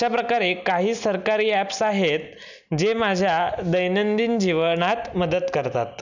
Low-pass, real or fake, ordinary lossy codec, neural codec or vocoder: 7.2 kHz; real; none; none